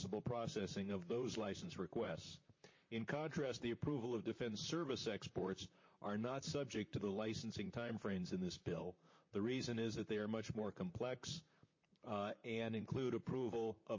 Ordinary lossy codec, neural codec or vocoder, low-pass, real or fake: MP3, 32 kbps; vocoder, 44.1 kHz, 128 mel bands, Pupu-Vocoder; 7.2 kHz; fake